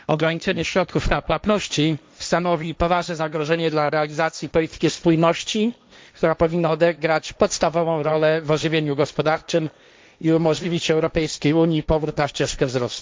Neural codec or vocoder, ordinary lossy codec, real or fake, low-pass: codec, 16 kHz, 1.1 kbps, Voila-Tokenizer; none; fake; none